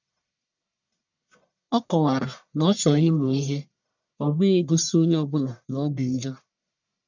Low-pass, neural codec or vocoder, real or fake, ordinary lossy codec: 7.2 kHz; codec, 44.1 kHz, 1.7 kbps, Pupu-Codec; fake; none